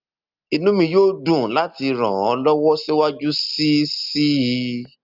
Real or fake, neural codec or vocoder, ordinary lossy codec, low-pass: real; none; Opus, 24 kbps; 5.4 kHz